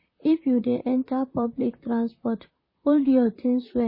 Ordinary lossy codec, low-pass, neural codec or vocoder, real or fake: MP3, 24 kbps; 5.4 kHz; vocoder, 44.1 kHz, 128 mel bands, Pupu-Vocoder; fake